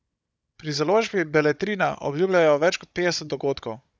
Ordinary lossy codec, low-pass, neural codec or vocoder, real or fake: none; none; codec, 16 kHz, 16 kbps, FunCodec, trained on Chinese and English, 50 frames a second; fake